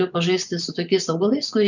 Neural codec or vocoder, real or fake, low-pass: vocoder, 24 kHz, 100 mel bands, Vocos; fake; 7.2 kHz